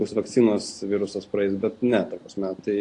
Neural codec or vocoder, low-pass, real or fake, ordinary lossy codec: none; 10.8 kHz; real; AAC, 48 kbps